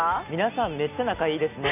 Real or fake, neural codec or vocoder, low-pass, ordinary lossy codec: real; none; 3.6 kHz; none